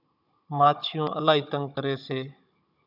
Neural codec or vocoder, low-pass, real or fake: codec, 16 kHz, 16 kbps, FunCodec, trained on Chinese and English, 50 frames a second; 5.4 kHz; fake